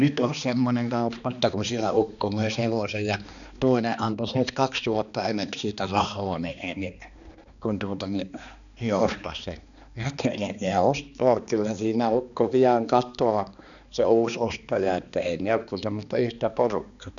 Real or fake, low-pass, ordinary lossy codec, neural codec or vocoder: fake; 7.2 kHz; none; codec, 16 kHz, 2 kbps, X-Codec, HuBERT features, trained on balanced general audio